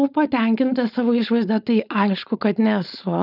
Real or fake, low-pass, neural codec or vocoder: fake; 5.4 kHz; codec, 16 kHz, 4.8 kbps, FACodec